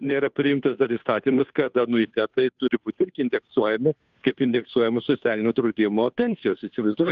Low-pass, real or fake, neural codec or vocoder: 7.2 kHz; fake; codec, 16 kHz, 2 kbps, FunCodec, trained on Chinese and English, 25 frames a second